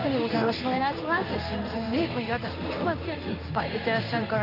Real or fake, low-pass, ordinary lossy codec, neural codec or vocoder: fake; 5.4 kHz; none; codec, 16 kHz in and 24 kHz out, 1 kbps, XY-Tokenizer